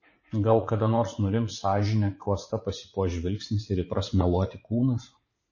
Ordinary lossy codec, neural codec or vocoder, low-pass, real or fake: MP3, 32 kbps; vocoder, 44.1 kHz, 80 mel bands, Vocos; 7.2 kHz; fake